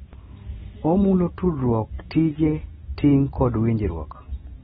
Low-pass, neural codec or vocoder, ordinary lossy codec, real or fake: 19.8 kHz; none; AAC, 16 kbps; real